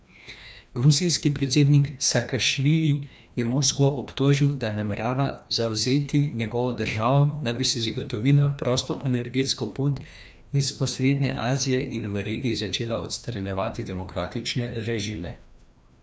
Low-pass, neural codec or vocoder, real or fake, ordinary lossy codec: none; codec, 16 kHz, 1 kbps, FreqCodec, larger model; fake; none